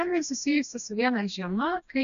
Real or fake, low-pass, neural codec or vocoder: fake; 7.2 kHz; codec, 16 kHz, 1 kbps, FreqCodec, smaller model